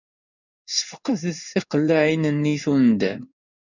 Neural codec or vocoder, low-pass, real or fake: codec, 16 kHz in and 24 kHz out, 1 kbps, XY-Tokenizer; 7.2 kHz; fake